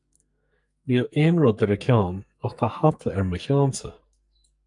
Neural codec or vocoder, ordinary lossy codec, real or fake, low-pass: codec, 44.1 kHz, 2.6 kbps, SNAC; MP3, 96 kbps; fake; 10.8 kHz